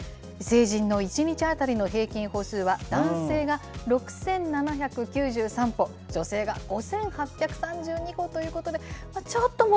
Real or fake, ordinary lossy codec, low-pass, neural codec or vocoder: real; none; none; none